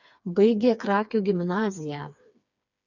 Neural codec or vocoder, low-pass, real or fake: codec, 16 kHz, 4 kbps, FreqCodec, smaller model; 7.2 kHz; fake